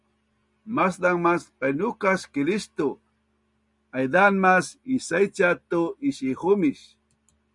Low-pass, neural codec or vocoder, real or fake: 10.8 kHz; none; real